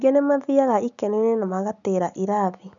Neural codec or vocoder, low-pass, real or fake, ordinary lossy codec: none; 7.2 kHz; real; none